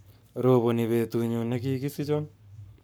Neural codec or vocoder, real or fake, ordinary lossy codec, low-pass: codec, 44.1 kHz, 7.8 kbps, Pupu-Codec; fake; none; none